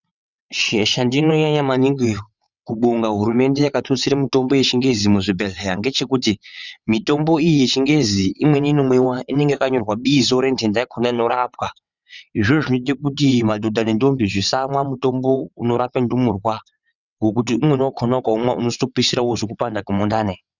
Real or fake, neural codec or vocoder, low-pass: fake; vocoder, 22.05 kHz, 80 mel bands, WaveNeXt; 7.2 kHz